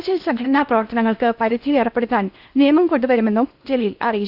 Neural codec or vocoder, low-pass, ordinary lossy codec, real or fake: codec, 16 kHz in and 24 kHz out, 0.8 kbps, FocalCodec, streaming, 65536 codes; 5.4 kHz; none; fake